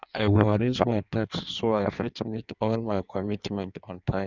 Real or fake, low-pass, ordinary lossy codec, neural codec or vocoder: fake; 7.2 kHz; none; codec, 16 kHz in and 24 kHz out, 1.1 kbps, FireRedTTS-2 codec